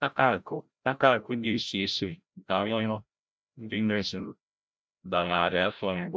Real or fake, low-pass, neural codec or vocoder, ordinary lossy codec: fake; none; codec, 16 kHz, 0.5 kbps, FreqCodec, larger model; none